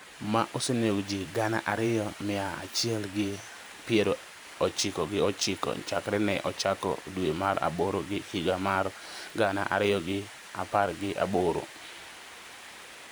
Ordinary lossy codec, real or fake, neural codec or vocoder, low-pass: none; fake; vocoder, 44.1 kHz, 128 mel bands every 512 samples, BigVGAN v2; none